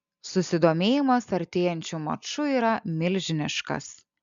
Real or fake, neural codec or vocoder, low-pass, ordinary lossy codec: real; none; 7.2 kHz; MP3, 64 kbps